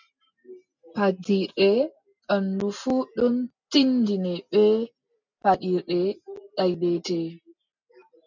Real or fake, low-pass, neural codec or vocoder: real; 7.2 kHz; none